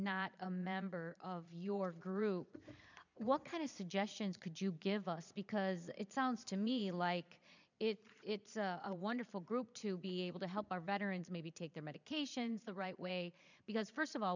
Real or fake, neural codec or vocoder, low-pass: fake; vocoder, 22.05 kHz, 80 mel bands, Vocos; 7.2 kHz